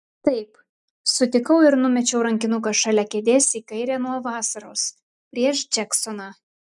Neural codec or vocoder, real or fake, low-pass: none; real; 10.8 kHz